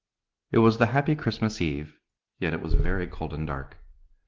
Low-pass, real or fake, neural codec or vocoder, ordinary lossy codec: 7.2 kHz; real; none; Opus, 16 kbps